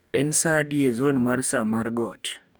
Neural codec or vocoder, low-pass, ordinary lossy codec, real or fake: codec, 44.1 kHz, 2.6 kbps, DAC; none; none; fake